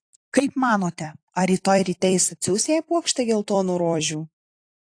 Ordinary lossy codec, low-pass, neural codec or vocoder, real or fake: AAC, 48 kbps; 9.9 kHz; vocoder, 44.1 kHz, 128 mel bands every 256 samples, BigVGAN v2; fake